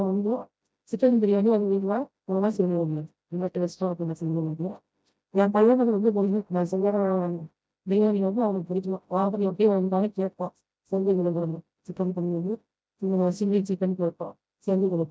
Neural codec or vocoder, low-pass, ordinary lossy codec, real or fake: codec, 16 kHz, 0.5 kbps, FreqCodec, smaller model; none; none; fake